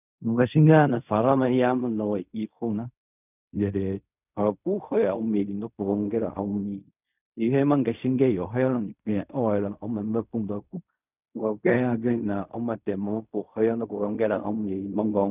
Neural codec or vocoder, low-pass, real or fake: codec, 16 kHz in and 24 kHz out, 0.4 kbps, LongCat-Audio-Codec, fine tuned four codebook decoder; 3.6 kHz; fake